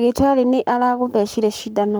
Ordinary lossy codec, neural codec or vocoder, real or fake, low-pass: none; codec, 44.1 kHz, 7.8 kbps, Pupu-Codec; fake; none